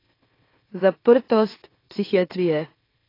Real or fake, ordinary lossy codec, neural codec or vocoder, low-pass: fake; AAC, 24 kbps; autoencoder, 44.1 kHz, a latent of 192 numbers a frame, MeloTTS; 5.4 kHz